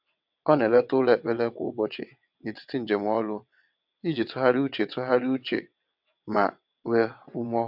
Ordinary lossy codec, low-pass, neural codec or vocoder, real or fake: MP3, 48 kbps; 5.4 kHz; vocoder, 22.05 kHz, 80 mel bands, WaveNeXt; fake